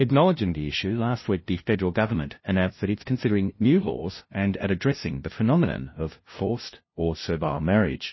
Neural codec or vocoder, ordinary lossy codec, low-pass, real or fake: codec, 16 kHz, 0.5 kbps, FunCodec, trained on LibriTTS, 25 frames a second; MP3, 24 kbps; 7.2 kHz; fake